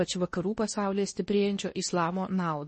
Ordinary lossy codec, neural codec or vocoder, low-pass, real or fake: MP3, 32 kbps; codec, 16 kHz in and 24 kHz out, 0.8 kbps, FocalCodec, streaming, 65536 codes; 10.8 kHz; fake